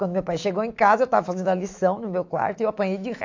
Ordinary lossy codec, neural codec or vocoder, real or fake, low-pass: AAC, 48 kbps; autoencoder, 48 kHz, 128 numbers a frame, DAC-VAE, trained on Japanese speech; fake; 7.2 kHz